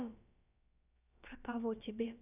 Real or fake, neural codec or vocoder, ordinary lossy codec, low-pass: fake; codec, 16 kHz, about 1 kbps, DyCAST, with the encoder's durations; none; 3.6 kHz